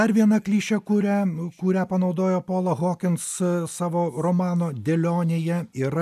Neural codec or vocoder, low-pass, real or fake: none; 14.4 kHz; real